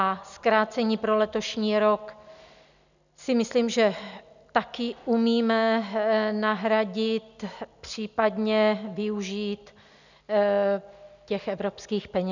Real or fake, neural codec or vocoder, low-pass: real; none; 7.2 kHz